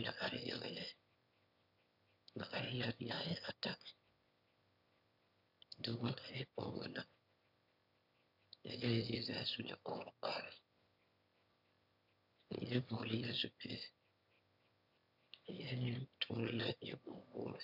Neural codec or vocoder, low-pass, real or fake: autoencoder, 22.05 kHz, a latent of 192 numbers a frame, VITS, trained on one speaker; 5.4 kHz; fake